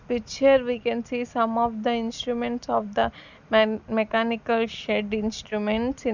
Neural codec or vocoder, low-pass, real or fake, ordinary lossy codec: none; 7.2 kHz; real; none